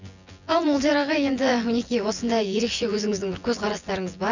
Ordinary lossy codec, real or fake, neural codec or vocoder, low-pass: none; fake; vocoder, 24 kHz, 100 mel bands, Vocos; 7.2 kHz